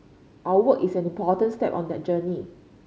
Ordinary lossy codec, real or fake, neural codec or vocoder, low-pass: none; real; none; none